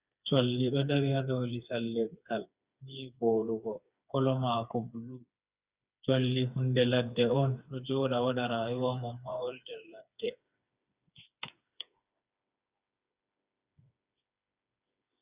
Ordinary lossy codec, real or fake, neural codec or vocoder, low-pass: Opus, 64 kbps; fake; codec, 16 kHz, 4 kbps, FreqCodec, smaller model; 3.6 kHz